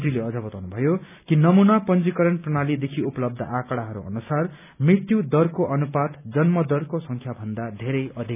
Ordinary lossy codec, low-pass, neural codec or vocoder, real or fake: none; 3.6 kHz; none; real